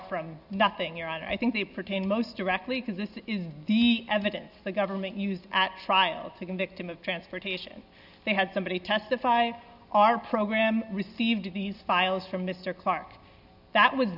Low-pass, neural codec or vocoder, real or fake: 5.4 kHz; none; real